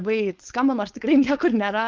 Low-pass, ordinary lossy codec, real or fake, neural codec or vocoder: 7.2 kHz; Opus, 32 kbps; fake; codec, 16 kHz, 4.8 kbps, FACodec